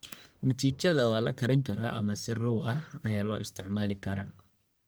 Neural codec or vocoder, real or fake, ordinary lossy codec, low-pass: codec, 44.1 kHz, 1.7 kbps, Pupu-Codec; fake; none; none